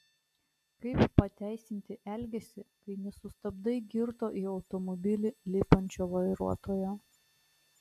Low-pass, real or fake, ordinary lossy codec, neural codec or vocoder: 14.4 kHz; real; AAC, 96 kbps; none